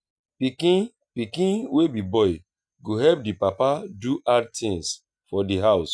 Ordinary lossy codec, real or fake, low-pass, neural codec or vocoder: none; real; 9.9 kHz; none